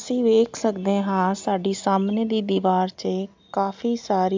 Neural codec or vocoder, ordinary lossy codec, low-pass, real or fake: none; MP3, 64 kbps; 7.2 kHz; real